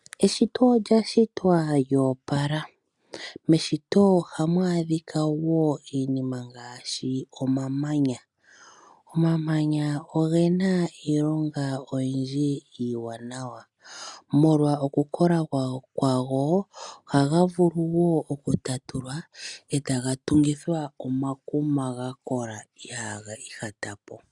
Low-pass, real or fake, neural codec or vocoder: 10.8 kHz; real; none